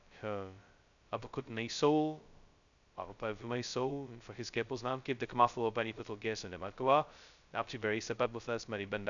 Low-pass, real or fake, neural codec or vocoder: 7.2 kHz; fake; codec, 16 kHz, 0.2 kbps, FocalCodec